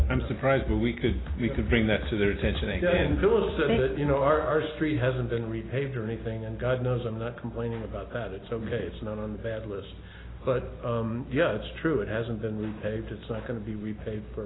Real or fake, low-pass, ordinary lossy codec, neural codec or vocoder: real; 7.2 kHz; AAC, 16 kbps; none